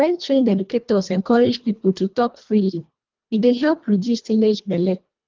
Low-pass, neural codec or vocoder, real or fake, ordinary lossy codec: 7.2 kHz; codec, 24 kHz, 1.5 kbps, HILCodec; fake; Opus, 24 kbps